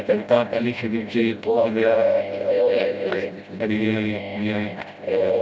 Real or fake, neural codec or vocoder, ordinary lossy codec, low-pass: fake; codec, 16 kHz, 0.5 kbps, FreqCodec, smaller model; none; none